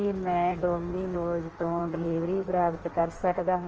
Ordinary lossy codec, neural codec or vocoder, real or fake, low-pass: Opus, 24 kbps; codec, 16 kHz in and 24 kHz out, 1.1 kbps, FireRedTTS-2 codec; fake; 7.2 kHz